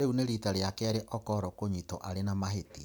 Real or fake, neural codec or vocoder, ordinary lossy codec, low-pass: real; none; none; none